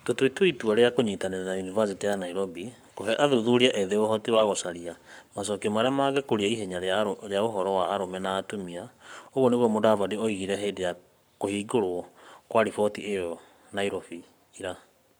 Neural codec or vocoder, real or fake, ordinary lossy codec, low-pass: codec, 44.1 kHz, 7.8 kbps, Pupu-Codec; fake; none; none